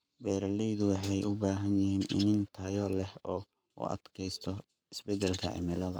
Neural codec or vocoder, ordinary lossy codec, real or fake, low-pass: codec, 44.1 kHz, 7.8 kbps, Pupu-Codec; none; fake; none